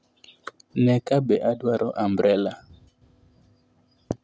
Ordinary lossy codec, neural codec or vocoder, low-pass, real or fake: none; none; none; real